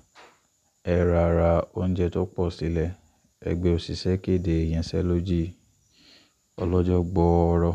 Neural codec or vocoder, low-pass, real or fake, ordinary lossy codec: none; 14.4 kHz; real; MP3, 96 kbps